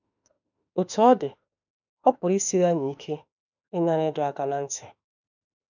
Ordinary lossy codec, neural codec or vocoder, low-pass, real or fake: none; autoencoder, 48 kHz, 32 numbers a frame, DAC-VAE, trained on Japanese speech; 7.2 kHz; fake